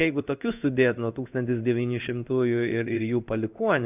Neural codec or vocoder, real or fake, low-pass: codec, 16 kHz in and 24 kHz out, 1 kbps, XY-Tokenizer; fake; 3.6 kHz